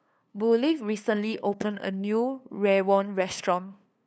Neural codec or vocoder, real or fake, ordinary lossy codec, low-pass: codec, 16 kHz, 2 kbps, FunCodec, trained on LibriTTS, 25 frames a second; fake; none; none